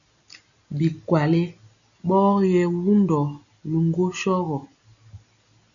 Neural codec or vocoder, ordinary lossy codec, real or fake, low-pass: none; MP3, 96 kbps; real; 7.2 kHz